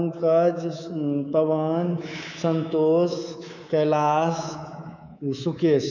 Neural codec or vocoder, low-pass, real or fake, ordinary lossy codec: codec, 24 kHz, 3.1 kbps, DualCodec; 7.2 kHz; fake; none